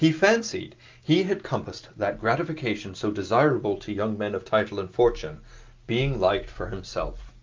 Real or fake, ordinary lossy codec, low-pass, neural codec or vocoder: real; Opus, 24 kbps; 7.2 kHz; none